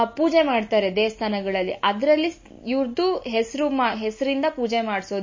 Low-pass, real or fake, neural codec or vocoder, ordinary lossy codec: 7.2 kHz; real; none; MP3, 32 kbps